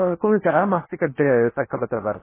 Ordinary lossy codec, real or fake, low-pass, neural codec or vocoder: MP3, 16 kbps; fake; 3.6 kHz; codec, 16 kHz in and 24 kHz out, 0.8 kbps, FocalCodec, streaming, 65536 codes